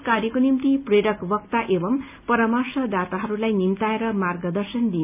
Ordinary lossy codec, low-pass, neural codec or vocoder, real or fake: none; 3.6 kHz; none; real